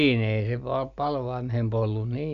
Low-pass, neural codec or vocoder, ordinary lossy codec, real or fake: 7.2 kHz; none; Opus, 64 kbps; real